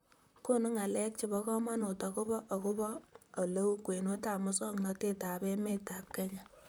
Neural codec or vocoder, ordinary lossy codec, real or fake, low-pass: vocoder, 44.1 kHz, 128 mel bands, Pupu-Vocoder; none; fake; none